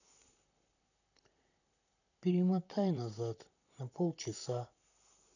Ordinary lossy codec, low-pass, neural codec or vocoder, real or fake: none; 7.2 kHz; vocoder, 44.1 kHz, 128 mel bands, Pupu-Vocoder; fake